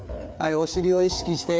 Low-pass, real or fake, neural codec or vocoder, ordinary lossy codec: none; fake; codec, 16 kHz, 4 kbps, FreqCodec, larger model; none